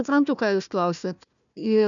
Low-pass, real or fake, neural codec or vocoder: 7.2 kHz; fake; codec, 16 kHz, 1 kbps, FunCodec, trained on Chinese and English, 50 frames a second